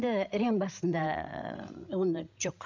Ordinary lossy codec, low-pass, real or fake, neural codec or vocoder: none; 7.2 kHz; fake; codec, 16 kHz, 16 kbps, FreqCodec, larger model